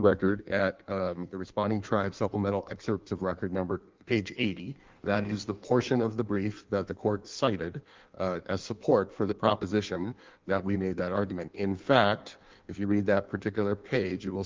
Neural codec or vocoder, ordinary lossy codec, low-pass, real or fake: codec, 16 kHz in and 24 kHz out, 1.1 kbps, FireRedTTS-2 codec; Opus, 24 kbps; 7.2 kHz; fake